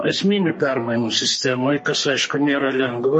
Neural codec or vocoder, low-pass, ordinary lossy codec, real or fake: codec, 32 kHz, 1.9 kbps, SNAC; 10.8 kHz; MP3, 32 kbps; fake